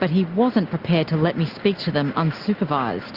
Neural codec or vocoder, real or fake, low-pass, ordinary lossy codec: none; real; 5.4 kHz; Opus, 64 kbps